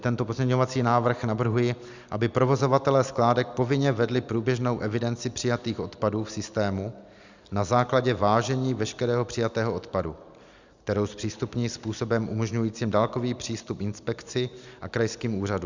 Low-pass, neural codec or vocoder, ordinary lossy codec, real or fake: 7.2 kHz; none; Opus, 64 kbps; real